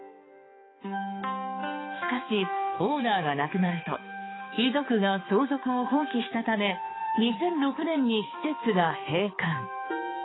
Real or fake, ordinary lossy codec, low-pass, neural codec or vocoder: fake; AAC, 16 kbps; 7.2 kHz; codec, 16 kHz, 4 kbps, X-Codec, HuBERT features, trained on balanced general audio